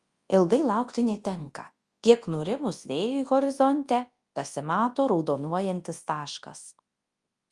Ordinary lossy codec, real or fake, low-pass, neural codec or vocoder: Opus, 32 kbps; fake; 10.8 kHz; codec, 24 kHz, 0.9 kbps, WavTokenizer, large speech release